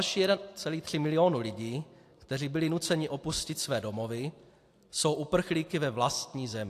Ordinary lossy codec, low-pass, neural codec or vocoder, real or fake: AAC, 64 kbps; 14.4 kHz; none; real